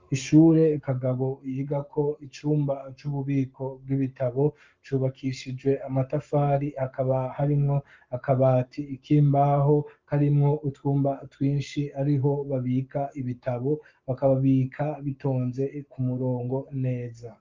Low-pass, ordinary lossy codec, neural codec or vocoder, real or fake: 7.2 kHz; Opus, 24 kbps; codec, 44.1 kHz, 7.8 kbps, Pupu-Codec; fake